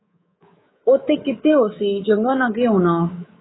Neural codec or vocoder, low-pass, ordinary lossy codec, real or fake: codec, 24 kHz, 3.1 kbps, DualCodec; 7.2 kHz; AAC, 16 kbps; fake